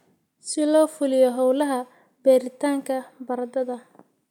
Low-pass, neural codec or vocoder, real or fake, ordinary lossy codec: 19.8 kHz; none; real; none